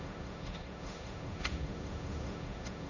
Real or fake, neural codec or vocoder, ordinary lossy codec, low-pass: fake; codec, 16 kHz, 1.1 kbps, Voila-Tokenizer; none; 7.2 kHz